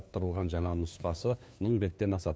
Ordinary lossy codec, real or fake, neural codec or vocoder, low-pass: none; fake; codec, 16 kHz, 2 kbps, FunCodec, trained on LibriTTS, 25 frames a second; none